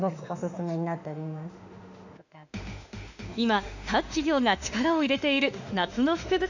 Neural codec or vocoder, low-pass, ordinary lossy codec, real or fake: autoencoder, 48 kHz, 32 numbers a frame, DAC-VAE, trained on Japanese speech; 7.2 kHz; none; fake